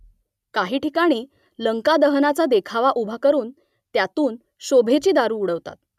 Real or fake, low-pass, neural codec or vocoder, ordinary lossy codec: real; 14.4 kHz; none; none